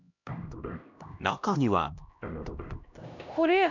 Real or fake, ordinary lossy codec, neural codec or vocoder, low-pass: fake; none; codec, 16 kHz, 1 kbps, X-Codec, HuBERT features, trained on LibriSpeech; 7.2 kHz